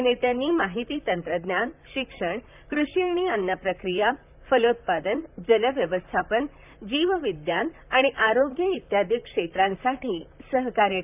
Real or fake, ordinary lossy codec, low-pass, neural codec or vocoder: fake; none; 3.6 kHz; vocoder, 44.1 kHz, 128 mel bands, Pupu-Vocoder